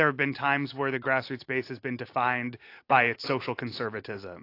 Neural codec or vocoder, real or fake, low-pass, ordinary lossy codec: none; real; 5.4 kHz; AAC, 32 kbps